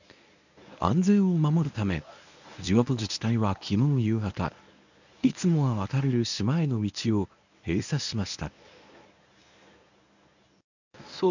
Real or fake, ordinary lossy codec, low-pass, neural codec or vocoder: fake; none; 7.2 kHz; codec, 24 kHz, 0.9 kbps, WavTokenizer, medium speech release version 1